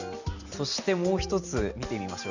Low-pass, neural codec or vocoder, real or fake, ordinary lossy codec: 7.2 kHz; none; real; none